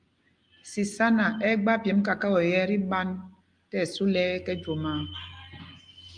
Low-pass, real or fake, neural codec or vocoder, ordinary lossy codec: 9.9 kHz; real; none; Opus, 32 kbps